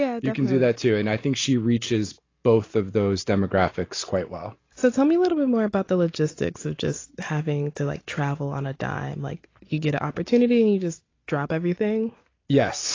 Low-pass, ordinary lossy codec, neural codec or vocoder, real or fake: 7.2 kHz; AAC, 32 kbps; none; real